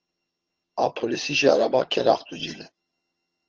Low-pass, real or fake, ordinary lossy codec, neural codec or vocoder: 7.2 kHz; fake; Opus, 16 kbps; vocoder, 22.05 kHz, 80 mel bands, HiFi-GAN